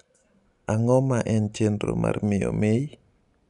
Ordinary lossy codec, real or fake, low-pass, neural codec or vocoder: none; real; 10.8 kHz; none